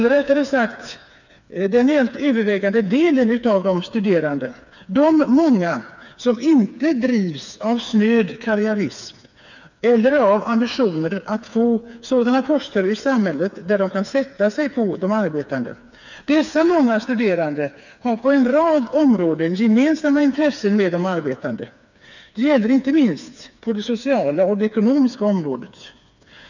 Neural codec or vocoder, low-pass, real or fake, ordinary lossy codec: codec, 16 kHz, 4 kbps, FreqCodec, smaller model; 7.2 kHz; fake; none